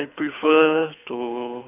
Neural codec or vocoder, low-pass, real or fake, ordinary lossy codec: codec, 24 kHz, 6 kbps, HILCodec; 3.6 kHz; fake; none